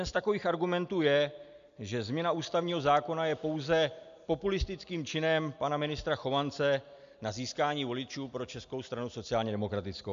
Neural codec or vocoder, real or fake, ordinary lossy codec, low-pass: none; real; MP3, 64 kbps; 7.2 kHz